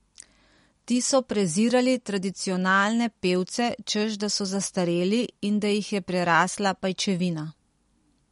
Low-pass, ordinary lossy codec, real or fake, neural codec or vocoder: 19.8 kHz; MP3, 48 kbps; real; none